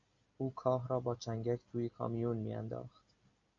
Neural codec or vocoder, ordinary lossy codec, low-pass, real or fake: none; MP3, 64 kbps; 7.2 kHz; real